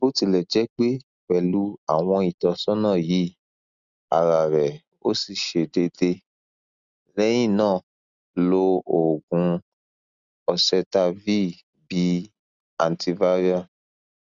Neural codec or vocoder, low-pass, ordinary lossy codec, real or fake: none; 7.2 kHz; Opus, 64 kbps; real